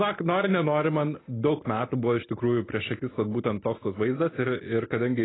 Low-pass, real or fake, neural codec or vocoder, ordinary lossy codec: 7.2 kHz; real; none; AAC, 16 kbps